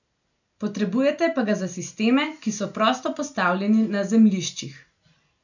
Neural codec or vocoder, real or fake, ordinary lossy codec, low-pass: none; real; none; 7.2 kHz